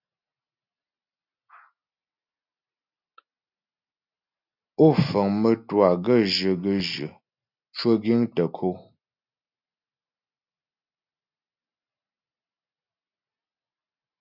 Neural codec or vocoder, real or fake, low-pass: none; real; 5.4 kHz